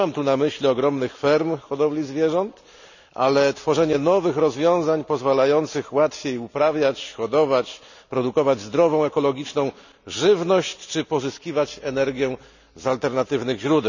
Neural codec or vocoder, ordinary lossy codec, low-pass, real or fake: none; none; 7.2 kHz; real